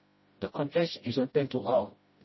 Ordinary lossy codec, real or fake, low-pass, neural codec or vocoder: MP3, 24 kbps; fake; 7.2 kHz; codec, 16 kHz, 0.5 kbps, FreqCodec, smaller model